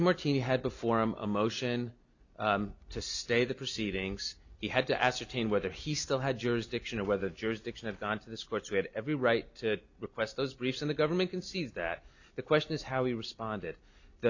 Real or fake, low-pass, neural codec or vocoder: real; 7.2 kHz; none